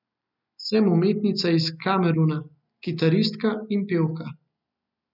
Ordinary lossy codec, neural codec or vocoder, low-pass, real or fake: none; none; 5.4 kHz; real